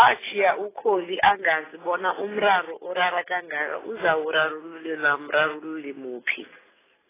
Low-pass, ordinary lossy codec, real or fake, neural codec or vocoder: 3.6 kHz; AAC, 16 kbps; real; none